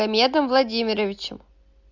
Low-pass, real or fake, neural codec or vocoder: 7.2 kHz; real; none